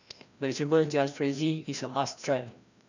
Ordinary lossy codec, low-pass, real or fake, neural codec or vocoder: none; 7.2 kHz; fake; codec, 16 kHz, 1 kbps, FreqCodec, larger model